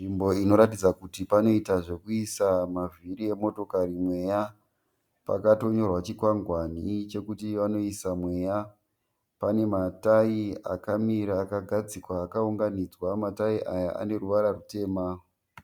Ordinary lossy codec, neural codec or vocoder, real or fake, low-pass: Opus, 64 kbps; none; real; 19.8 kHz